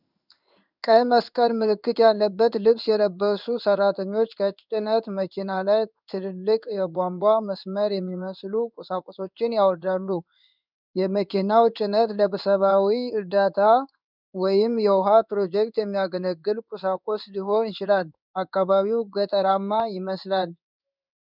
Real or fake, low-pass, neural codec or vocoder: fake; 5.4 kHz; codec, 16 kHz in and 24 kHz out, 1 kbps, XY-Tokenizer